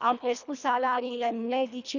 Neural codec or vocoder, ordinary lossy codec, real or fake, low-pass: codec, 24 kHz, 1.5 kbps, HILCodec; none; fake; 7.2 kHz